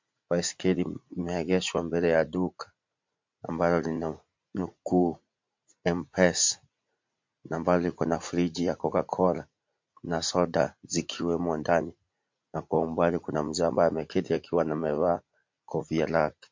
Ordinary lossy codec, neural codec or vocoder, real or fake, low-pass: MP3, 48 kbps; vocoder, 44.1 kHz, 80 mel bands, Vocos; fake; 7.2 kHz